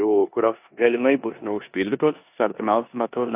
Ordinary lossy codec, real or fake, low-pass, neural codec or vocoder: AAC, 32 kbps; fake; 3.6 kHz; codec, 16 kHz in and 24 kHz out, 0.9 kbps, LongCat-Audio-Codec, four codebook decoder